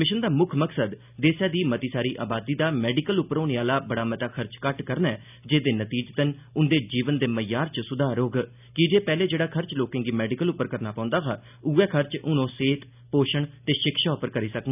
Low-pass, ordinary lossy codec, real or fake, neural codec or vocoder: 3.6 kHz; none; real; none